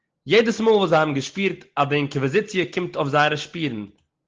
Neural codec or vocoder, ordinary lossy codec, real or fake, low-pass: none; Opus, 16 kbps; real; 7.2 kHz